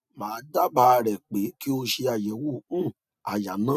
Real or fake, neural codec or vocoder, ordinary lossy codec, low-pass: real; none; none; 14.4 kHz